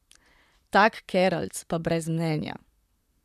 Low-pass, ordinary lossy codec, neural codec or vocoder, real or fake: 14.4 kHz; none; vocoder, 44.1 kHz, 128 mel bands, Pupu-Vocoder; fake